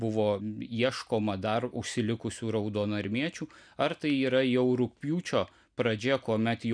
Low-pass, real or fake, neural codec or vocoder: 9.9 kHz; real; none